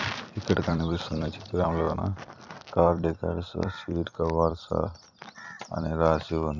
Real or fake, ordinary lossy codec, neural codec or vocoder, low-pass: fake; none; vocoder, 44.1 kHz, 128 mel bands every 256 samples, BigVGAN v2; 7.2 kHz